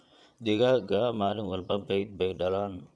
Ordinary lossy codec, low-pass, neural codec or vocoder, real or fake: none; none; vocoder, 22.05 kHz, 80 mel bands, Vocos; fake